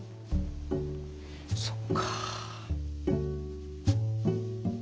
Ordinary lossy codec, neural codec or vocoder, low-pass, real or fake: none; none; none; real